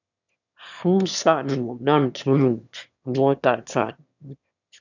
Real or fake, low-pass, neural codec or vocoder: fake; 7.2 kHz; autoencoder, 22.05 kHz, a latent of 192 numbers a frame, VITS, trained on one speaker